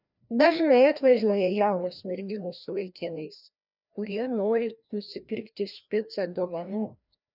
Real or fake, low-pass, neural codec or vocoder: fake; 5.4 kHz; codec, 16 kHz, 1 kbps, FreqCodec, larger model